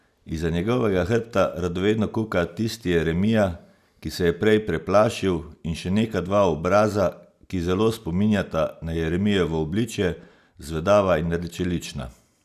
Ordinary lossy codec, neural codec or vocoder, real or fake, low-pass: none; none; real; 14.4 kHz